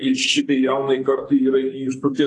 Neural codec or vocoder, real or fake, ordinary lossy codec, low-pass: codec, 44.1 kHz, 2.6 kbps, SNAC; fake; AAC, 48 kbps; 10.8 kHz